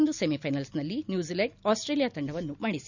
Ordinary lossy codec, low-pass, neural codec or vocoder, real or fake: none; 7.2 kHz; none; real